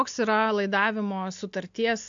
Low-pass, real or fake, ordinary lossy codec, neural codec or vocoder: 7.2 kHz; real; AAC, 64 kbps; none